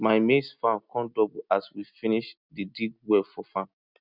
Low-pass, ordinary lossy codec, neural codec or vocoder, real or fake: 5.4 kHz; none; none; real